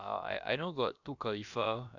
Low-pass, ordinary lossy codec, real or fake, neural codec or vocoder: 7.2 kHz; none; fake; codec, 16 kHz, about 1 kbps, DyCAST, with the encoder's durations